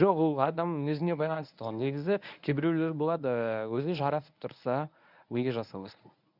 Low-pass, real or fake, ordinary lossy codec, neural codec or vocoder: 5.4 kHz; fake; none; codec, 24 kHz, 0.9 kbps, WavTokenizer, medium speech release version 1